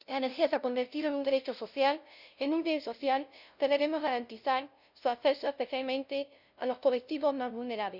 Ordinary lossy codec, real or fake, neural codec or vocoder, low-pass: none; fake; codec, 16 kHz, 0.5 kbps, FunCodec, trained on LibriTTS, 25 frames a second; 5.4 kHz